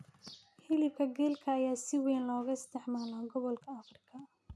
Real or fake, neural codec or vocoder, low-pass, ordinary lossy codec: real; none; none; none